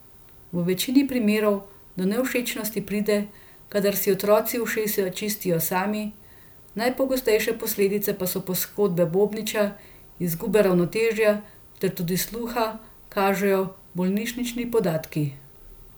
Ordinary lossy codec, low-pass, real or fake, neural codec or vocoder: none; none; real; none